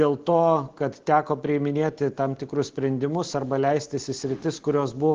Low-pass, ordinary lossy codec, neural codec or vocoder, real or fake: 7.2 kHz; Opus, 16 kbps; none; real